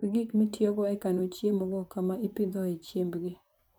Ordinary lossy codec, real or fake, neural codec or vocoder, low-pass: none; fake; vocoder, 44.1 kHz, 128 mel bands, Pupu-Vocoder; none